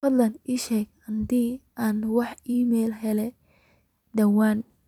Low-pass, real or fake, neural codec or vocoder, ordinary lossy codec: 19.8 kHz; fake; vocoder, 44.1 kHz, 128 mel bands every 256 samples, BigVGAN v2; none